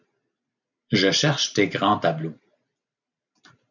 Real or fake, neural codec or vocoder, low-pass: real; none; 7.2 kHz